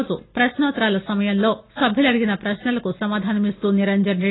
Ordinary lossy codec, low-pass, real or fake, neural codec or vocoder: AAC, 16 kbps; 7.2 kHz; real; none